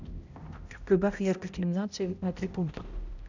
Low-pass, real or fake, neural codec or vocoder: 7.2 kHz; fake; codec, 16 kHz, 0.5 kbps, X-Codec, HuBERT features, trained on balanced general audio